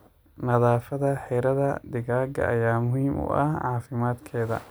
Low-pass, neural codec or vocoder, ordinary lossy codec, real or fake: none; none; none; real